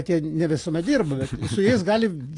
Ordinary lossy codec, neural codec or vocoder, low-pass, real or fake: AAC, 48 kbps; none; 10.8 kHz; real